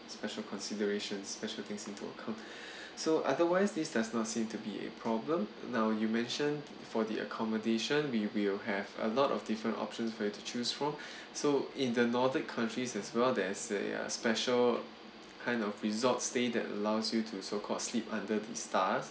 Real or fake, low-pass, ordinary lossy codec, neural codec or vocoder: real; none; none; none